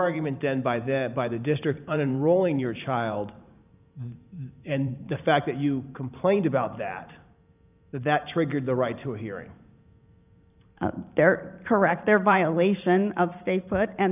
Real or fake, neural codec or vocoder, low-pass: fake; vocoder, 44.1 kHz, 128 mel bands every 512 samples, BigVGAN v2; 3.6 kHz